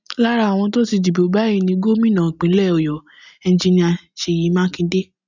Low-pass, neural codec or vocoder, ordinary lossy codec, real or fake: 7.2 kHz; none; none; real